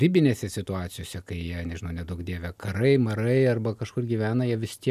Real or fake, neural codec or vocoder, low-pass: real; none; 14.4 kHz